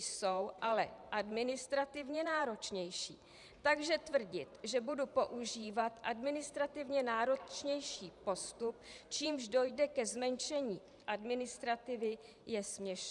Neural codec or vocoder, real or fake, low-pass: vocoder, 44.1 kHz, 128 mel bands every 512 samples, BigVGAN v2; fake; 10.8 kHz